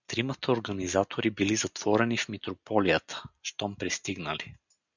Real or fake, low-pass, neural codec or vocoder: real; 7.2 kHz; none